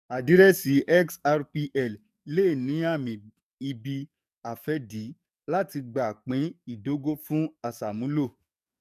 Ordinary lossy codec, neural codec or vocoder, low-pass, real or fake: none; codec, 44.1 kHz, 7.8 kbps, DAC; 14.4 kHz; fake